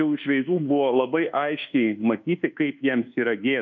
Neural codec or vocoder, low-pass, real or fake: codec, 24 kHz, 1.2 kbps, DualCodec; 7.2 kHz; fake